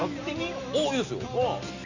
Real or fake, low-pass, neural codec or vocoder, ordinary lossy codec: real; 7.2 kHz; none; none